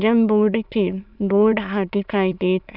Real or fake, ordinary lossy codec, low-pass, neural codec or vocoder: fake; none; 5.4 kHz; autoencoder, 22.05 kHz, a latent of 192 numbers a frame, VITS, trained on many speakers